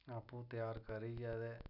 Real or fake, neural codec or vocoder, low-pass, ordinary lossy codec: real; none; 5.4 kHz; none